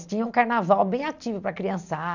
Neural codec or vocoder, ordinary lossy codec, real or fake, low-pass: none; none; real; 7.2 kHz